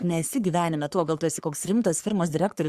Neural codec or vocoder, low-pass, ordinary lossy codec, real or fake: codec, 44.1 kHz, 3.4 kbps, Pupu-Codec; 14.4 kHz; Opus, 64 kbps; fake